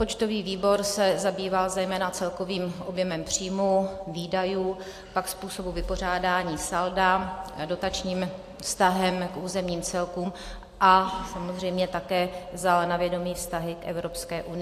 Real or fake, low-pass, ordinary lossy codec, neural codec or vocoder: real; 14.4 kHz; AAC, 64 kbps; none